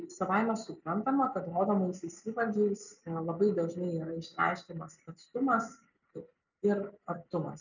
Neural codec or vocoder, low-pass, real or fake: none; 7.2 kHz; real